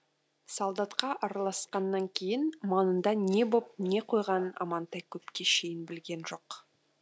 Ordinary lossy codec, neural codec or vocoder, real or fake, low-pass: none; none; real; none